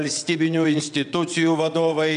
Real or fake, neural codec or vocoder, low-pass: fake; vocoder, 22.05 kHz, 80 mel bands, WaveNeXt; 9.9 kHz